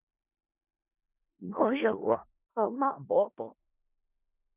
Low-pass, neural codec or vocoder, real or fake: 3.6 kHz; codec, 16 kHz in and 24 kHz out, 0.4 kbps, LongCat-Audio-Codec, four codebook decoder; fake